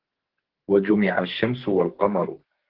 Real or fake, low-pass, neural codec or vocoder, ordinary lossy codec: fake; 5.4 kHz; codec, 44.1 kHz, 2.6 kbps, SNAC; Opus, 16 kbps